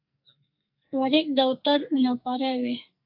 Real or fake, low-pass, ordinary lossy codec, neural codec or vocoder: fake; 5.4 kHz; AAC, 48 kbps; codec, 44.1 kHz, 2.6 kbps, SNAC